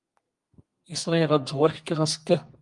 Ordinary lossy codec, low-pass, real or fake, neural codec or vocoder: Opus, 32 kbps; 10.8 kHz; fake; codec, 32 kHz, 1.9 kbps, SNAC